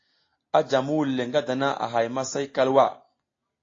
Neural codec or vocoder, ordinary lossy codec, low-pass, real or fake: none; AAC, 32 kbps; 7.2 kHz; real